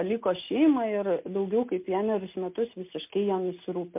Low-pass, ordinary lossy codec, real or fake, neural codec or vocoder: 3.6 kHz; MP3, 32 kbps; real; none